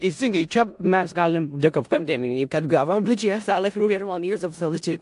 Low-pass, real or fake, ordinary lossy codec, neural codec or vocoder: 10.8 kHz; fake; AAC, 64 kbps; codec, 16 kHz in and 24 kHz out, 0.4 kbps, LongCat-Audio-Codec, four codebook decoder